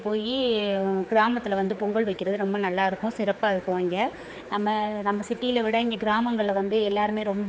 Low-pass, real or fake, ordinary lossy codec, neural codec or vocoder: none; fake; none; codec, 16 kHz, 4 kbps, X-Codec, HuBERT features, trained on general audio